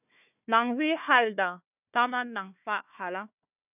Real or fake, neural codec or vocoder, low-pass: fake; codec, 16 kHz, 1 kbps, FunCodec, trained on Chinese and English, 50 frames a second; 3.6 kHz